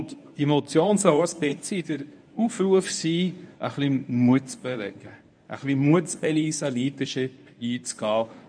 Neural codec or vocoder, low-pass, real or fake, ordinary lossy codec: codec, 24 kHz, 0.9 kbps, WavTokenizer, medium speech release version 1; 9.9 kHz; fake; none